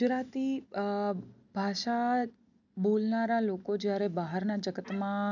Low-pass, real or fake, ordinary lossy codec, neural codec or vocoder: 7.2 kHz; real; none; none